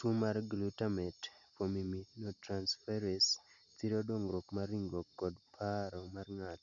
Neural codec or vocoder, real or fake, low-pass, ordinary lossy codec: none; real; 7.2 kHz; none